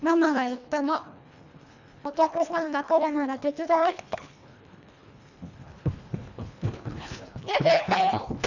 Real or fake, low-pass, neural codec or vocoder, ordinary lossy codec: fake; 7.2 kHz; codec, 24 kHz, 1.5 kbps, HILCodec; none